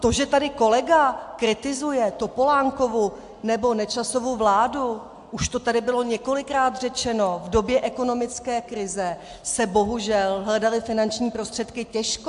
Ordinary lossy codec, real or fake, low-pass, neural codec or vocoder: AAC, 64 kbps; real; 10.8 kHz; none